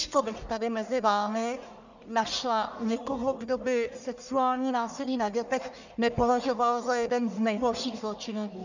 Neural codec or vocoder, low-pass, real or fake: codec, 44.1 kHz, 1.7 kbps, Pupu-Codec; 7.2 kHz; fake